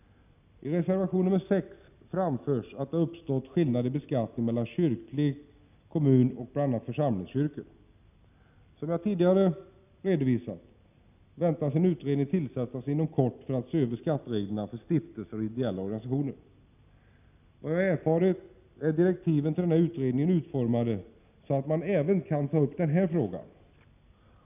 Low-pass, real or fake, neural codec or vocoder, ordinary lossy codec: 3.6 kHz; real; none; none